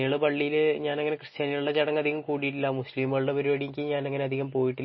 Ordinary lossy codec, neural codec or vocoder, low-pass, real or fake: MP3, 24 kbps; none; 7.2 kHz; real